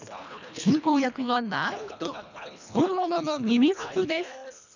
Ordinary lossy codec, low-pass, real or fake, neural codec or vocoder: none; 7.2 kHz; fake; codec, 24 kHz, 1.5 kbps, HILCodec